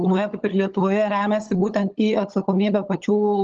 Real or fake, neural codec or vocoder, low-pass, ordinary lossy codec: fake; codec, 16 kHz, 16 kbps, FunCodec, trained on LibriTTS, 50 frames a second; 7.2 kHz; Opus, 24 kbps